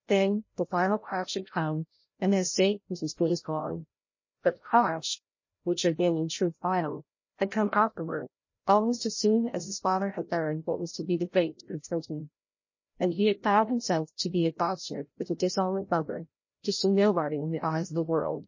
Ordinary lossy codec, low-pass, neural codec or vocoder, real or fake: MP3, 32 kbps; 7.2 kHz; codec, 16 kHz, 0.5 kbps, FreqCodec, larger model; fake